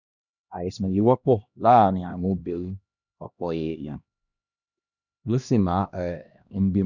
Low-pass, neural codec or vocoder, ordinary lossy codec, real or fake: 7.2 kHz; codec, 16 kHz, 1 kbps, X-Codec, HuBERT features, trained on LibriSpeech; none; fake